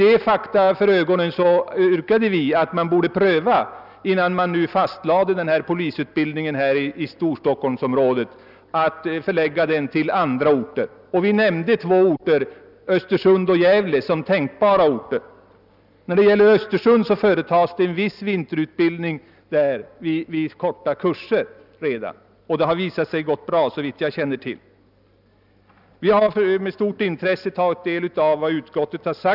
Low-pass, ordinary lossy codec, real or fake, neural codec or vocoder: 5.4 kHz; none; real; none